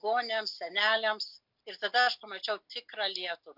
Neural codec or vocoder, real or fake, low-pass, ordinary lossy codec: none; real; 5.4 kHz; MP3, 48 kbps